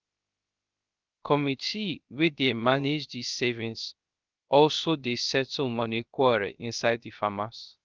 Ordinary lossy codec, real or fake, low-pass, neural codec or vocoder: Opus, 32 kbps; fake; 7.2 kHz; codec, 16 kHz, 0.3 kbps, FocalCodec